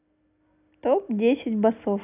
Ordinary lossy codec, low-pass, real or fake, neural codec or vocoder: none; 3.6 kHz; real; none